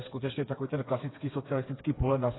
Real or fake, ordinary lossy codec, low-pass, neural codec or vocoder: fake; AAC, 16 kbps; 7.2 kHz; codec, 16 kHz, 4 kbps, FreqCodec, smaller model